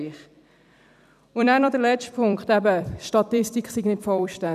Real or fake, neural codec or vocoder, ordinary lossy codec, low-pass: fake; vocoder, 44.1 kHz, 128 mel bands every 256 samples, BigVGAN v2; none; 14.4 kHz